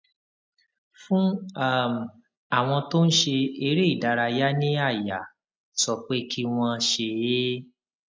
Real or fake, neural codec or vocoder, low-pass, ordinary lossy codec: real; none; none; none